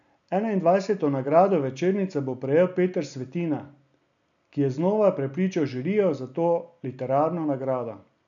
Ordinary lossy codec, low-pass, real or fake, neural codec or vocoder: none; 7.2 kHz; real; none